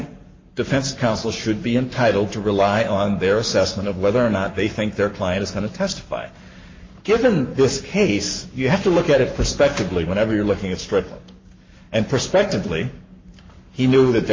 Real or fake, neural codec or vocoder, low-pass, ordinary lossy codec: fake; codec, 44.1 kHz, 7.8 kbps, Pupu-Codec; 7.2 kHz; MP3, 32 kbps